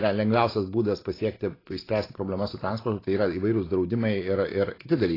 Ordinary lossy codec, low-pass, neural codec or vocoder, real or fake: AAC, 24 kbps; 5.4 kHz; none; real